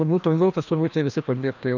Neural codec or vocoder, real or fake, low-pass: codec, 16 kHz, 1 kbps, FreqCodec, larger model; fake; 7.2 kHz